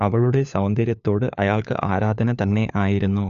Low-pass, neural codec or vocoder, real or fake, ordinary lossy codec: 7.2 kHz; codec, 16 kHz, 4 kbps, FunCodec, trained on LibriTTS, 50 frames a second; fake; none